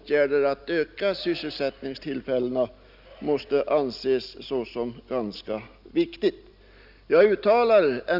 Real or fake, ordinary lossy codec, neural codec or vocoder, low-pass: real; none; none; 5.4 kHz